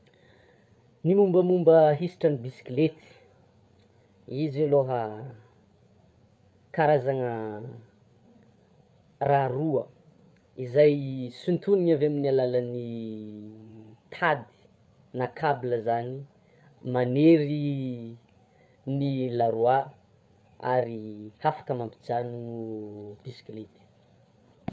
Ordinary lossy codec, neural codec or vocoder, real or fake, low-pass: none; codec, 16 kHz, 8 kbps, FreqCodec, larger model; fake; none